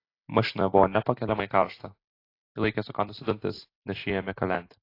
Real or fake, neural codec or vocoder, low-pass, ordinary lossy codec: real; none; 5.4 kHz; AAC, 32 kbps